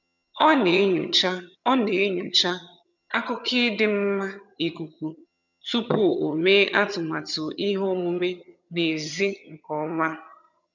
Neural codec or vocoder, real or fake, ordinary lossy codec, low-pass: vocoder, 22.05 kHz, 80 mel bands, HiFi-GAN; fake; none; 7.2 kHz